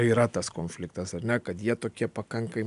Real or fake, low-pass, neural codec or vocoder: real; 10.8 kHz; none